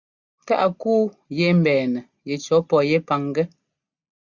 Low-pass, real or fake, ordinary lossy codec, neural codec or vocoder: 7.2 kHz; real; Opus, 64 kbps; none